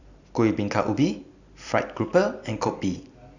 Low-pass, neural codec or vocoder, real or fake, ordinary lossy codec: 7.2 kHz; vocoder, 44.1 kHz, 128 mel bands every 256 samples, BigVGAN v2; fake; none